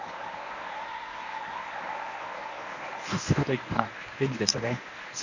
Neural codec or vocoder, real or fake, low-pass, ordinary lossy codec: codec, 24 kHz, 0.9 kbps, WavTokenizer, medium speech release version 1; fake; 7.2 kHz; none